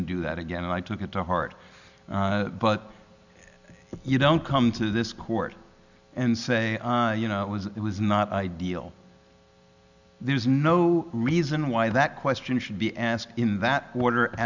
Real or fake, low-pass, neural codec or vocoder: real; 7.2 kHz; none